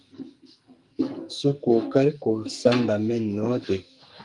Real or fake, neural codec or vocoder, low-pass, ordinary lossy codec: fake; codec, 44.1 kHz, 2.6 kbps, SNAC; 10.8 kHz; Opus, 32 kbps